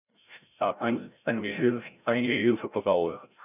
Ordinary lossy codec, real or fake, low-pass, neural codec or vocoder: none; fake; 3.6 kHz; codec, 16 kHz, 0.5 kbps, FreqCodec, larger model